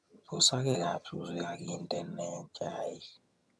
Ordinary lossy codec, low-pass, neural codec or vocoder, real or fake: none; none; vocoder, 22.05 kHz, 80 mel bands, HiFi-GAN; fake